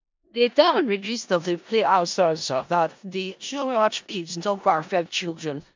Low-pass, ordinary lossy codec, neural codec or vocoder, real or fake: 7.2 kHz; MP3, 64 kbps; codec, 16 kHz in and 24 kHz out, 0.4 kbps, LongCat-Audio-Codec, four codebook decoder; fake